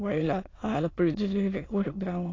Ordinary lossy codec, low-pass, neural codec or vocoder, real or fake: AAC, 32 kbps; 7.2 kHz; autoencoder, 22.05 kHz, a latent of 192 numbers a frame, VITS, trained on many speakers; fake